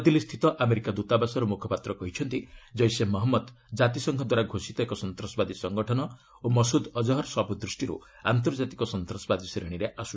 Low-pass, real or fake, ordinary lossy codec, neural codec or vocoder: none; real; none; none